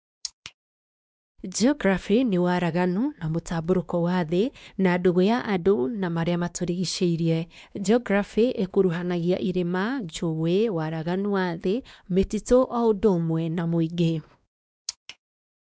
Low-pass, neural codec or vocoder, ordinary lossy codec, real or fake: none; codec, 16 kHz, 2 kbps, X-Codec, WavLM features, trained on Multilingual LibriSpeech; none; fake